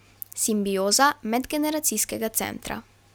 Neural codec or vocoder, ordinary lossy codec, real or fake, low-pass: none; none; real; none